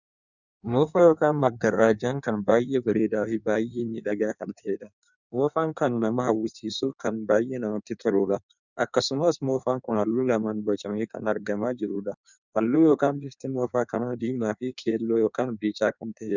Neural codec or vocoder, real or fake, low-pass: codec, 16 kHz in and 24 kHz out, 1.1 kbps, FireRedTTS-2 codec; fake; 7.2 kHz